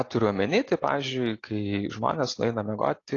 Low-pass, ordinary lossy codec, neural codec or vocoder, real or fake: 7.2 kHz; AAC, 32 kbps; none; real